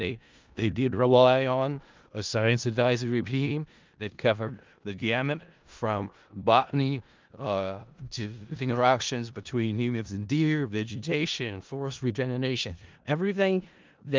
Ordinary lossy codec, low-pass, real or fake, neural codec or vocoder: Opus, 24 kbps; 7.2 kHz; fake; codec, 16 kHz in and 24 kHz out, 0.4 kbps, LongCat-Audio-Codec, four codebook decoder